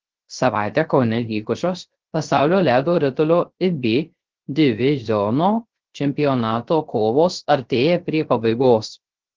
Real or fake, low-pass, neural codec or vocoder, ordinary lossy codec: fake; 7.2 kHz; codec, 16 kHz, 0.3 kbps, FocalCodec; Opus, 16 kbps